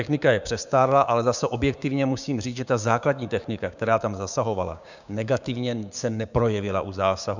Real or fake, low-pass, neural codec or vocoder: fake; 7.2 kHz; codec, 16 kHz, 6 kbps, DAC